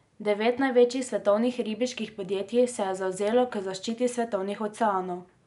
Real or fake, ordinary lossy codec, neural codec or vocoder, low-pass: real; none; none; 10.8 kHz